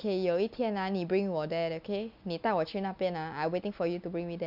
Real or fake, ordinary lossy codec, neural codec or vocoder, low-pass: real; none; none; 5.4 kHz